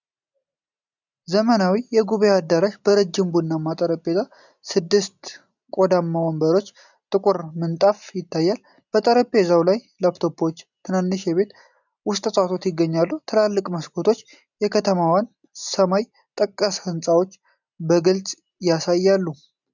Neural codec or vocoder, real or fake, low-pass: none; real; 7.2 kHz